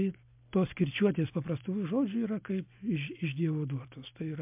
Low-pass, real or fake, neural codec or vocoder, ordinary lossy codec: 3.6 kHz; real; none; MP3, 32 kbps